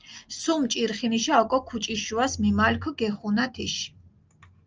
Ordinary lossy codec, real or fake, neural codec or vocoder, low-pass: Opus, 24 kbps; real; none; 7.2 kHz